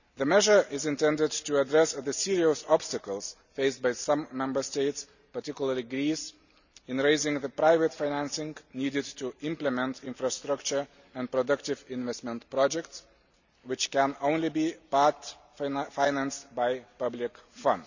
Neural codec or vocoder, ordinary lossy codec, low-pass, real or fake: none; none; 7.2 kHz; real